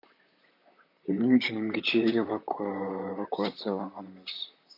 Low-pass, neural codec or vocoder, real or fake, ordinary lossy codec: 5.4 kHz; vocoder, 44.1 kHz, 128 mel bands, Pupu-Vocoder; fake; AAC, 48 kbps